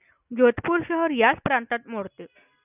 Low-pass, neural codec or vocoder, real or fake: 3.6 kHz; none; real